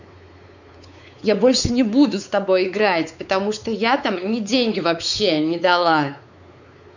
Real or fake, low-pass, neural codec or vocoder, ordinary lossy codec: fake; 7.2 kHz; codec, 16 kHz, 4 kbps, X-Codec, WavLM features, trained on Multilingual LibriSpeech; none